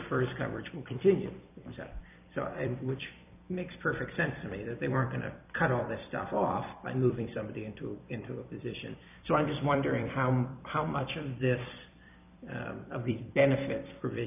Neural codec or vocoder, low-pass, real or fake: vocoder, 44.1 kHz, 128 mel bands every 256 samples, BigVGAN v2; 3.6 kHz; fake